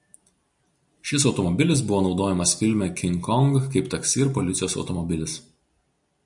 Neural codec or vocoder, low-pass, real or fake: none; 10.8 kHz; real